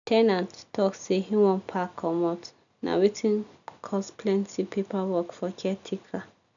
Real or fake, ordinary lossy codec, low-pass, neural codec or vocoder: real; none; 7.2 kHz; none